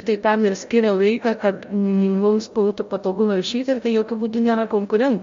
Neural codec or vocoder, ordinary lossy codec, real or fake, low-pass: codec, 16 kHz, 0.5 kbps, FreqCodec, larger model; MP3, 48 kbps; fake; 7.2 kHz